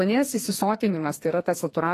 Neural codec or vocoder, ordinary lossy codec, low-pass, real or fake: codec, 44.1 kHz, 2.6 kbps, SNAC; AAC, 48 kbps; 14.4 kHz; fake